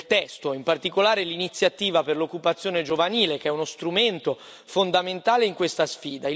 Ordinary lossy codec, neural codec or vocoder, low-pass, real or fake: none; none; none; real